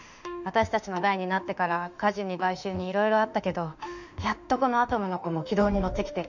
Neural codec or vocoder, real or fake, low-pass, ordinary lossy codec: autoencoder, 48 kHz, 32 numbers a frame, DAC-VAE, trained on Japanese speech; fake; 7.2 kHz; none